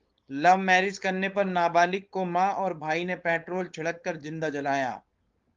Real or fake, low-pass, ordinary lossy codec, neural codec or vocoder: fake; 7.2 kHz; Opus, 32 kbps; codec, 16 kHz, 4.8 kbps, FACodec